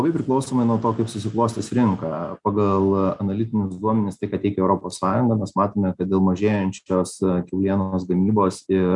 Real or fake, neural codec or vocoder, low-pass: real; none; 10.8 kHz